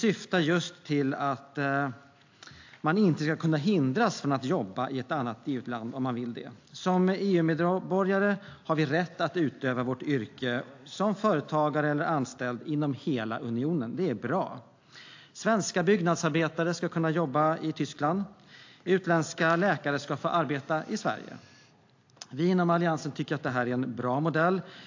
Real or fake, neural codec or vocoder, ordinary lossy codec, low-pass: real; none; AAC, 48 kbps; 7.2 kHz